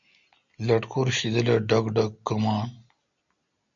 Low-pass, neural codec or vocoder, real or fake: 7.2 kHz; none; real